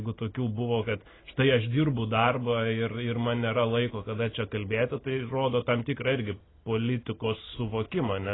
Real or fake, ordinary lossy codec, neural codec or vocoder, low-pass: real; AAC, 16 kbps; none; 7.2 kHz